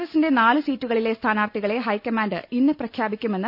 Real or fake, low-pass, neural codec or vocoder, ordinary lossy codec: real; 5.4 kHz; none; none